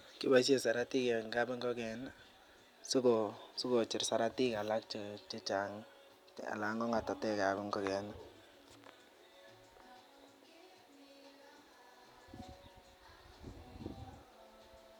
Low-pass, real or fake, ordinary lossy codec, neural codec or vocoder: 19.8 kHz; real; none; none